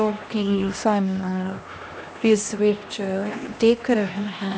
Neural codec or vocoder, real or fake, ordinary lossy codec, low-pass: codec, 16 kHz, 1 kbps, X-Codec, HuBERT features, trained on LibriSpeech; fake; none; none